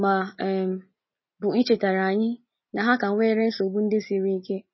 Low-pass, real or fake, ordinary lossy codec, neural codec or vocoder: 7.2 kHz; real; MP3, 24 kbps; none